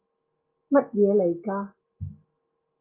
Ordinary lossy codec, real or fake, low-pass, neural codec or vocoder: Opus, 32 kbps; real; 3.6 kHz; none